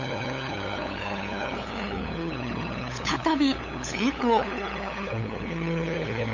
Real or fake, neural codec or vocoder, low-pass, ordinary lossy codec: fake; codec, 16 kHz, 8 kbps, FunCodec, trained on LibriTTS, 25 frames a second; 7.2 kHz; none